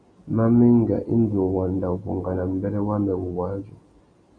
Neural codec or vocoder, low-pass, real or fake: none; 9.9 kHz; real